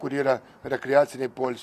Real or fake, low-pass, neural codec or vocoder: real; 14.4 kHz; none